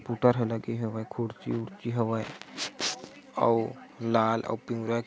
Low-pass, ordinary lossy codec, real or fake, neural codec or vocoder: none; none; real; none